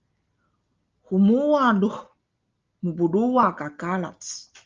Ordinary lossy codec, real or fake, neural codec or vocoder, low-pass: Opus, 32 kbps; real; none; 7.2 kHz